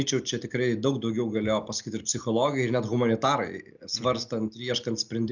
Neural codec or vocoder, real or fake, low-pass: none; real; 7.2 kHz